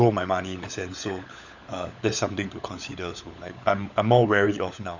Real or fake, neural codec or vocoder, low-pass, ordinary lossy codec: fake; codec, 16 kHz, 16 kbps, FunCodec, trained on LibriTTS, 50 frames a second; 7.2 kHz; none